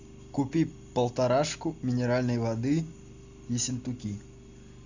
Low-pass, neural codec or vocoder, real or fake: 7.2 kHz; none; real